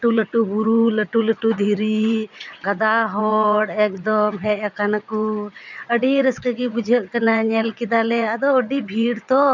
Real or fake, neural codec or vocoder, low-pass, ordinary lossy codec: fake; vocoder, 22.05 kHz, 80 mel bands, WaveNeXt; 7.2 kHz; none